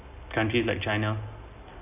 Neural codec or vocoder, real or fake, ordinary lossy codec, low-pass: none; real; none; 3.6 kHz